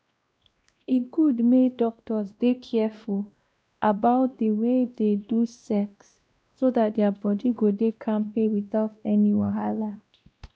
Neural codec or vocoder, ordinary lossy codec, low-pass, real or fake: codec, 16 kHz, 1 kbps, X-Codec, WavLM features, trained on Multilingual LibriSpeech; none; none; fake